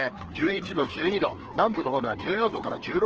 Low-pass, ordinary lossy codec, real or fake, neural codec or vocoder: 7.2 kHz; Opus, 16 kbps; fake; codec, 16 kHz, 2 kbps, FreqCodec, larger model